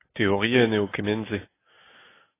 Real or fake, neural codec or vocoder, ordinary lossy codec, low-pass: real; none; AAC, 16 kbps; 3.6 kHz